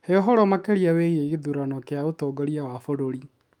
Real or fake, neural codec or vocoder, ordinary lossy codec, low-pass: fake; autoencoder, 48 kHz, 128 numbers a frame, DAC-VAE, trained on Japanese speech; Opus, 32 kbps; 19.8 kHz